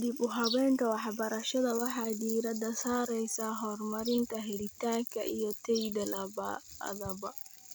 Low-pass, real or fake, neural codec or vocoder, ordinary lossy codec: none; real; none; none